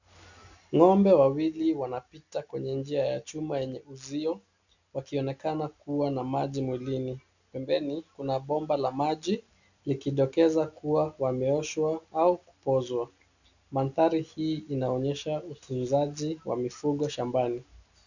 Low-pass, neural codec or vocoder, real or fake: 7.2 kHz; none; real